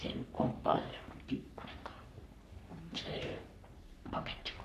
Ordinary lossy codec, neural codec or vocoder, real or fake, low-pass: none; codec, 44.1 kHz, 3.4 kbps, Pupu-Codec; fake; 14.4 kHz